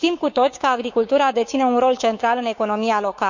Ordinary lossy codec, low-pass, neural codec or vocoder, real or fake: none; 7.2 kHz; codec, 16 kHz, 6 kbps, DAC; fake